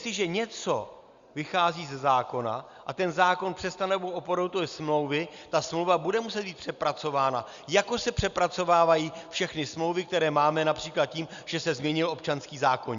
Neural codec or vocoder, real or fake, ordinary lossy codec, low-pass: none; real; Opus, 64 kbps; 7.2 kHz